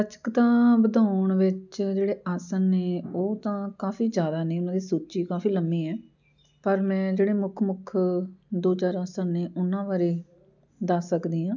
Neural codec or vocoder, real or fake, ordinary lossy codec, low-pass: none; real; none; 7.2 kHz